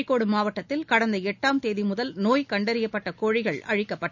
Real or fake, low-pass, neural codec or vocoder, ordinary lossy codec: real; 7.2 kHz; none; none